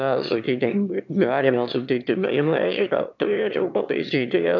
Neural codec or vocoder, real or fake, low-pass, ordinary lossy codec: autoencoder, 22.05 kHz, a latent of 192 numbers a frame, VITS, trained on one speaker; fake; 7.2 kHz; MP3, 64 kbps